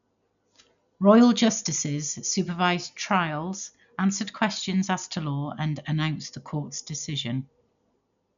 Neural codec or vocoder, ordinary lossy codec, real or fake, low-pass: none; none; real; 7.2 kHz